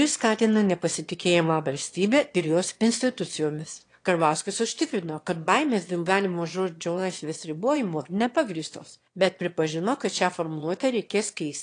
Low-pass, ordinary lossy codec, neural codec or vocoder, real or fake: 9.9 kHz; AAC, 48 kbps; autoencoder, 22.05 kHz, a latent of 192 numbers a frame, VITS, trained on one speaker; fake